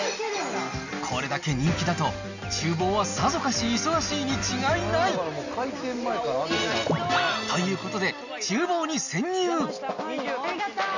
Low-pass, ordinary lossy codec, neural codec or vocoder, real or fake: 7.2 kHz; none; none; real